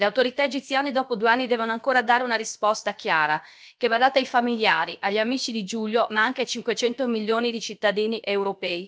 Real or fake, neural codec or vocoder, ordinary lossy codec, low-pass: fake; codec, 16 kHz, about 1 kbps, DyCAST, with the encoder's durations; none; none